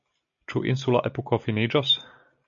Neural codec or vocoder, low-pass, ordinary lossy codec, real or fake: none; 7.2 kHz; MP3, 96 kbps; real